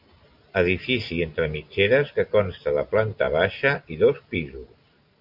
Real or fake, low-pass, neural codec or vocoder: real; 5.4 kHz; none